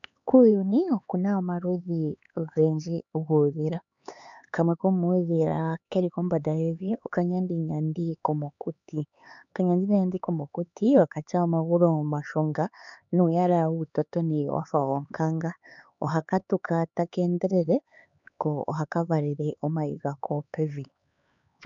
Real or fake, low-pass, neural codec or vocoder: fake; 7.2 kHz; codec, 16 kHz, 4 kbps, X-Codec, HuBERT features, trained on LibriSpeech